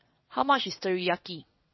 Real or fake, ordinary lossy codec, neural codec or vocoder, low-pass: fake; MP3, 24 kbps; vocoder, 22.05 kHz, 80 mel bands, WaveNeXt; 7.2 kHz